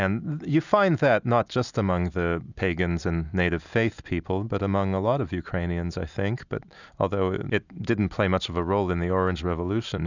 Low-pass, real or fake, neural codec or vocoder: 7.2 kHz; real; none